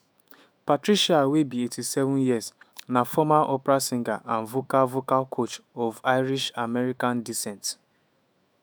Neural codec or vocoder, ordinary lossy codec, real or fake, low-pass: autoencoder, 48 kHz, 128 numbers a frame, DAC-VAE, trained on Japanese speech; none; fake; none